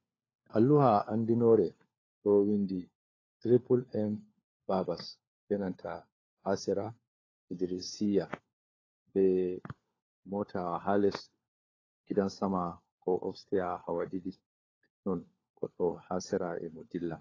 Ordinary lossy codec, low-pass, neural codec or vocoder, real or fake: AAC, 32 kbps; 7.2 kHz; codec, 16 kHz, 4 kbps, FunCodec, trained on LibriTTS, 50 frames a second; fake